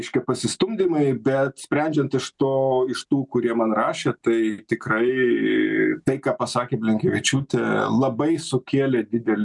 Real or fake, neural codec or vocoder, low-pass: real; none; 10.8 kHz